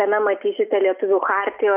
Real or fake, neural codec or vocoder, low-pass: real; none; 3.6 kHz